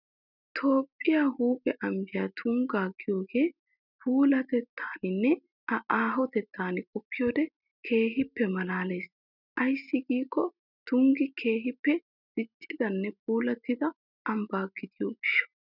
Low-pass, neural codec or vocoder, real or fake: 5.4 kHz; none; real